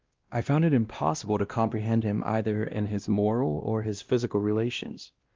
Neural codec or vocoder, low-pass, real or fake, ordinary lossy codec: codec, 16 kHz, 1 kbps, X-Codec, WavLM features, trained on Multilingual LibriSpeech; 7.2 kHz; fake; Opus, 32 kbps